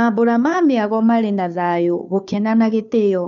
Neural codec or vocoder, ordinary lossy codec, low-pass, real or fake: codec, 16 kHz, 2 kbps, FunCodec, trained on Chinese and English, 25 frames a second; none; 7.2 kHz; fake